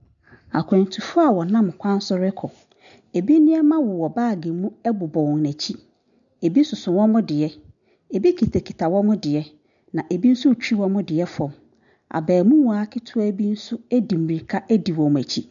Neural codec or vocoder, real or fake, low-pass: none; real; 7.2 kHz